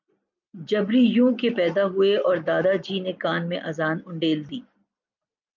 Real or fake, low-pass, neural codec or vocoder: real; 7.2 kHz; none